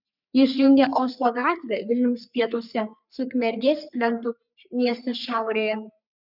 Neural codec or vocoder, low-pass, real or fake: codec, 44.1 kHz, 3.4 kbps, Pupu-Codec; 5.4 kHz; fake